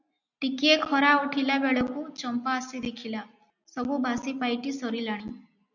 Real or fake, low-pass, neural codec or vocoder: real; 7.2 kHz; none